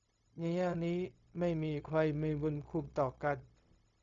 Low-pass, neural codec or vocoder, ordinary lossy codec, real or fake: 7.2 kHz; codec, 16 kHz, 0.4 kbps, LongCat-Audio-Codec; AAC, 48 kbps; fake